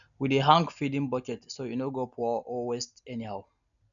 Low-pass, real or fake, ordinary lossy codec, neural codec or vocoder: 7.2 kHz; real; none; none